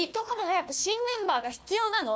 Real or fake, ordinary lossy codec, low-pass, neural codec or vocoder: fake; none; none; codec, 16 kHz, 1 kbps, FunCodec, trained on Chinese and English, 50 frames a second